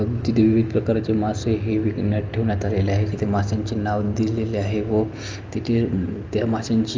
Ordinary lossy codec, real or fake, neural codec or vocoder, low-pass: Opus, 24 kbps; real; none; 7.2 kHz